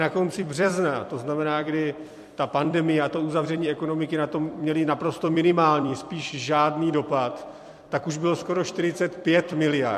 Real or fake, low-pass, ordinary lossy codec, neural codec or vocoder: fake; 14.4 kHz; MP3, 64 kbps; vocoder, 44.1 kHz, 128 mel bands every 256 samples, BigVGAN v2